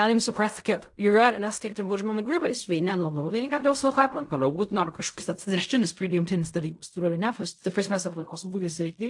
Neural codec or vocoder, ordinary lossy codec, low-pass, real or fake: codec, 16 kHz in and 24 kHz out, 0.4 kbps, LongCat-Audio-Codec, fine tuned four codebook decoder; AAC, 64 kbps; 10.8 kHz; fake